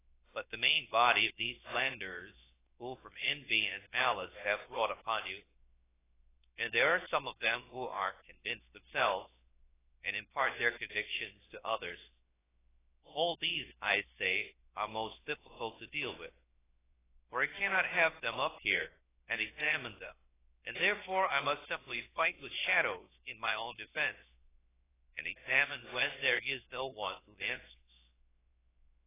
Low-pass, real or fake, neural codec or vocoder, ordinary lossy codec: 3.6 kHz; fake; codec, 16 kHz, 0.7 kbps, FocalCodec; AAC, 16 kbps